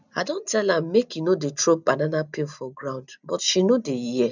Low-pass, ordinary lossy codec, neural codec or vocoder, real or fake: 7.2 kHz; none; none; real